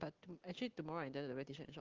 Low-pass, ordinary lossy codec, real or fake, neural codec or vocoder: 7.2 kHz; Opus, 16 kbps; real; none